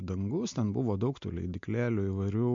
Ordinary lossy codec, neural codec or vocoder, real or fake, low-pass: MP3, 48 kbps; none; real; 7.2 kHz